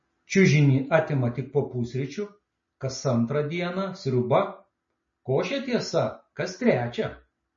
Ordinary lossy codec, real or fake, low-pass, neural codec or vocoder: MP3, 32 kbps; real; 7.2 kHz; none